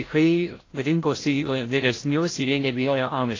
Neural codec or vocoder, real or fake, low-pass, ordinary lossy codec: codec, 16 kHz, 0.5 kbps, FreqCodec, larger model; fake; 7.2 kHz; AAC, 32 kbps